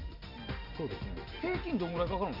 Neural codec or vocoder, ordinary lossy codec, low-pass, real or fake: none; none; 5.4 kHz; real